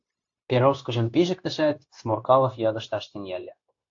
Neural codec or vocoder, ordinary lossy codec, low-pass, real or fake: codec, 16 kHz, 0.9 kbps, LongCat-Audio-Codec; AAC, 48 kbps; 7.2 kHz; fake